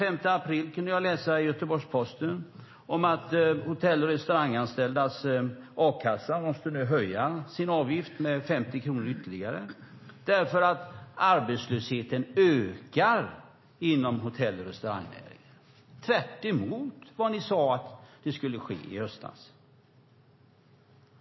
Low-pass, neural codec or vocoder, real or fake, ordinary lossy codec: 7.2 kHz; none; real; MP3, 24 kbps